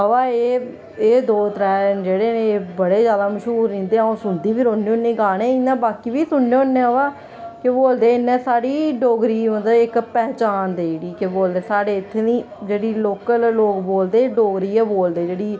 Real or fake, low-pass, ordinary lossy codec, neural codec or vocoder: real; none; none; none